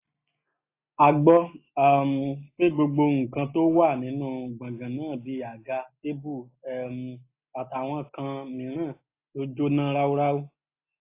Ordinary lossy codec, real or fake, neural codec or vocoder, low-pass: AAC, 24 kbps; real; none; 3.6 kHz